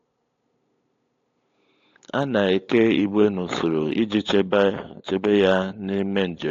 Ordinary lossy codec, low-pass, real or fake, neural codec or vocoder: AAC, 48 kbps; 7.2 kHz; fake; codec, 16 kHz, 8 kbps, FunCodec, trained on LibriTTS, 25 frames a second